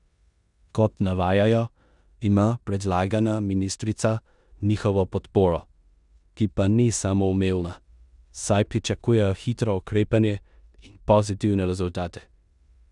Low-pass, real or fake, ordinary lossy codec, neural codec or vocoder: 10.8 kHz; fake; none; codec, 16 kHz in and 24 kHz out, 0.9 kbps, LongCat-Audio-Codec, four codebook decoder